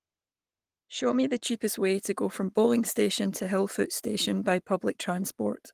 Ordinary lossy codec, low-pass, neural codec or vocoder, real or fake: Opus, 24 kbps; 14.4 kHz; codec, 44.1 kHz, 7.8 kbps, Pupu-Codec; fake